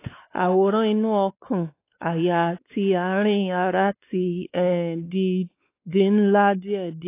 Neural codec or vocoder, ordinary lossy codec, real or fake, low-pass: codec, 16 kHz, 4 kbps, X-Codec, WavLM features, trained on Multilingual LibriSpeech; AAC, 24 kbps; fake; 3.6 kHz